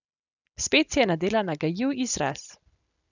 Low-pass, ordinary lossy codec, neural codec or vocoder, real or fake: 7.2 kHz; none; none; real